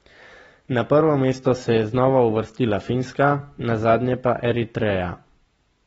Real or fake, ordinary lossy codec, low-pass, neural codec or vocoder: real; AAC, 24 kbps; 14.4 kHz; none